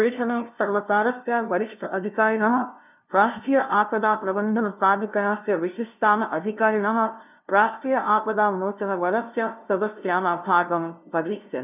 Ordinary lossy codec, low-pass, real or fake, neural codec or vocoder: none; 3.6 kHz; fake; codec, 16 kHz, 0.5 kbps, FunCodec, trained on LibriTTS, 25 frames a second